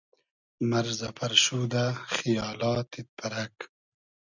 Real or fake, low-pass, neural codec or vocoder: real; 7.2 kHz; none